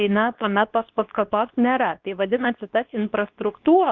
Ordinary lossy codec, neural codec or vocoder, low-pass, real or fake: Opus, 32 kbps; codec, 24 kHz, 0.9 kbps, WavTokenizer, medium speech release version 2; 7.2 kHz; fake